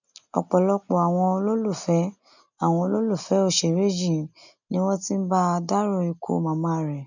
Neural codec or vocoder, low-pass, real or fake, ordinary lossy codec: none; 7.2 kHz; real; none